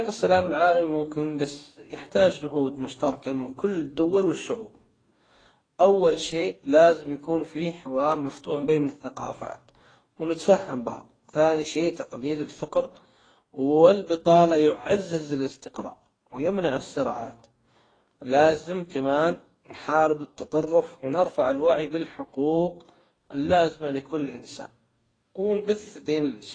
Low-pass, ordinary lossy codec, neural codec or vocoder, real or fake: 9.9 kHz; AAC, 32 kbps; codec, 44.1 kHz, 2.6 kbps, DAC; fake